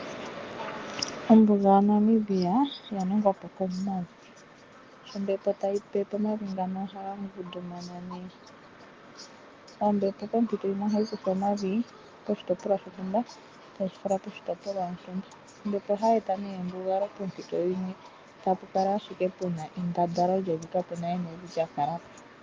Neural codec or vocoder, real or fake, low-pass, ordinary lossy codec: none; real; 7.2 kHz; Opus, 32 kbps